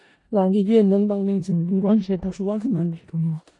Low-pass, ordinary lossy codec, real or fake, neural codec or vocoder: 10.8 kHz; AAC, 48 kbps; fake; codec, 16 kHz in and 24 kHz out, 0.4 kbps, LongCat-Audio-Codec, four codebook decoder